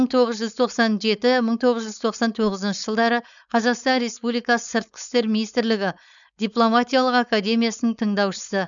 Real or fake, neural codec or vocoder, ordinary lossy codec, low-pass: fake; codec, 16 kHz, 4.8 kbps, FACodec; none; 7.2 kHz